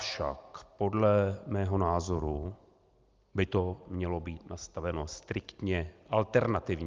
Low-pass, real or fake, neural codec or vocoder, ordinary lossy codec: 7.2 kHz; real; none; Opus, 24 kbps